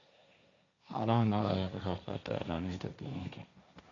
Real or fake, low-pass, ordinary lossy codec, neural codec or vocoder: fake; 7.2 kHz; none; codec, 16 kHz, 1.1 kbps, Voila-Tokenizer